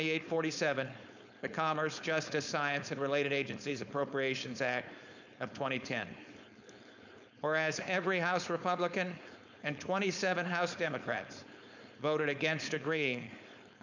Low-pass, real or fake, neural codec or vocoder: 7.2 kHz; fake; codec, 16 kHz, 4.8 kbps, FACodec